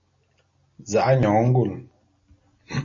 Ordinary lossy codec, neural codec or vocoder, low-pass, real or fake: MP3, 32 kbps; none; 7.2 kHz; real